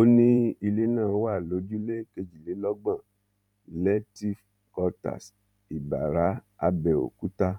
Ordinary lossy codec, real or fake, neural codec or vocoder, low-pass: none; fake; vocoder, 44.1 kHz, 128 mel bands every 512 samples, BigVGAN v2; 19.8 kHz